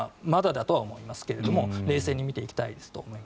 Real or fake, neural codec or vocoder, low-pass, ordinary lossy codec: real; none; none; none